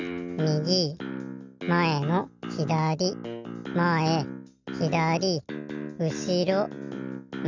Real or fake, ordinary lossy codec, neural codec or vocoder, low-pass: real; none; none; 7.2 kHz